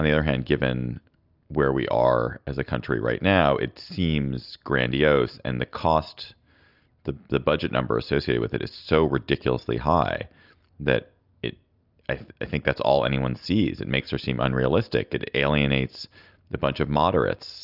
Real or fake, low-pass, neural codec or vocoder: real; 5.4 kHz; none